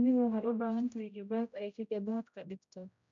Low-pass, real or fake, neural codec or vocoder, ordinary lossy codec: 7.2 kHz; fake; codec, 16 kHz, 0.5 kbps, X-Codec, HuBERT features, trained on general audio; MP3, 64 kbps